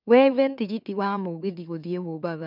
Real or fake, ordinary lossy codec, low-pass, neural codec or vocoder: fake; none; 5.4 kHz; autoencoder, 44.1 kHz, a latent of 192 numbers a frame, MeloTTS